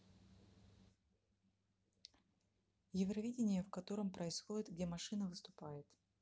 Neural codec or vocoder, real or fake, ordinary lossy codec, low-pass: none; real; none; none